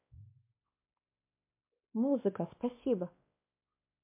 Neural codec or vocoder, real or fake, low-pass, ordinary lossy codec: codec, 16 kHz, 2 kbps, X-Codec, WavLM features, trained on Multilingual LibriSpeech; fake; 3.6 kHz; MP3, 24 kbps